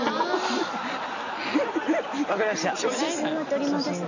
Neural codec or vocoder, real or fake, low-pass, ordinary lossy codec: none; real; 7.2 kHz; none